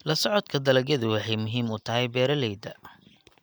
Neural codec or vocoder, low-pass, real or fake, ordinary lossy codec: none; none; real; none